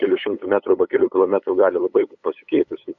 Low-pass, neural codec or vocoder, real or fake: 7.2 kHz; codec, 16 kHz, 8 kbps, FunCodec, trained on LibriTTS, 25 frames a second; fake